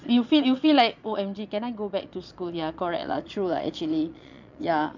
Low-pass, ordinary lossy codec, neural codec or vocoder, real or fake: 7.2 kHz; none; vocoder, 22.05 kHz, 80 mel bands, Vocos; fake